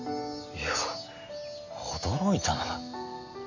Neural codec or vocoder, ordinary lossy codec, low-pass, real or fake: none; none; 7.2 kHz; real